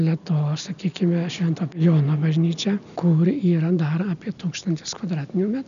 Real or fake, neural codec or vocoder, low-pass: real; none; 7.2 kHz